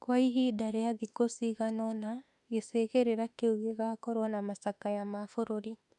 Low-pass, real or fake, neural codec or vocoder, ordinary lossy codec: 10.8 kHz; fake; autoencoder, 48 kHz, 32 numbers a frame, DAC-VAE, trained on Japanese speech; none